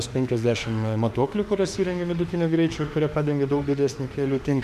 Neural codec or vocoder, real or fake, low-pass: autoencoder, 48 kHz, 32 numbers a frame, DAC-VAE, trained on Japanese speech; fake; 14.4 kHz